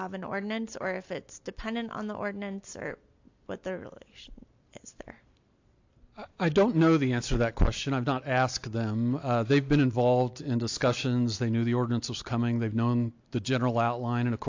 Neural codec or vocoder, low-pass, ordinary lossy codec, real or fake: none; 7.2 kHz; AAC, 48 kbps; real